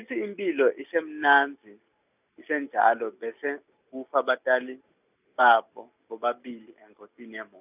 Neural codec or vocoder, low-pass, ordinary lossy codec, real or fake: none; 3.6 kHz; none; real